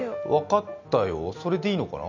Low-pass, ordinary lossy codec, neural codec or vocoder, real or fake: 7.2 kHz; none; none; real